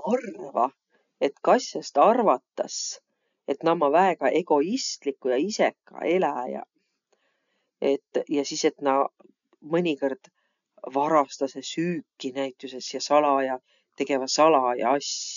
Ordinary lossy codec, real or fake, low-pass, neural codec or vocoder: none; real; 7.2 kHz; none